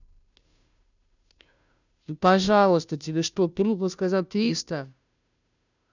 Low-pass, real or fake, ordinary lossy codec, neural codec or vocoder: 7.2 kHz; fake; none; codec, 16 kHz, 0.5 kbps, FunCodec, trained on Chinese and English, 25 frames a second